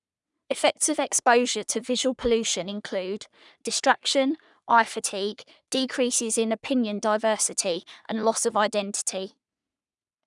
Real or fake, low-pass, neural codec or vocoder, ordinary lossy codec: fake; 10.8 kHz; codec, 44.1 kHz, 3.4 kbps, Pupu-Codec; none